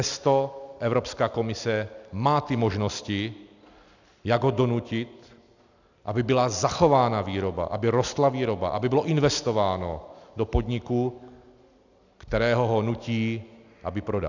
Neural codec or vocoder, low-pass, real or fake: none; 7.2 kHz; real